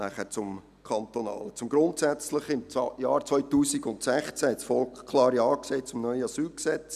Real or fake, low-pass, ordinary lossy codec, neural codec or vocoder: real; 14.4 kHz; none; none